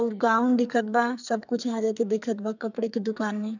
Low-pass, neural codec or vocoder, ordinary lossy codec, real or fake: 7.2 kHz; codec, 44.1 kHz, 2.6 kbps, SNAC; none; fake